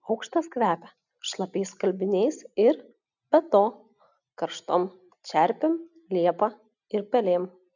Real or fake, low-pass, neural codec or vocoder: real; 7.2 kHz; none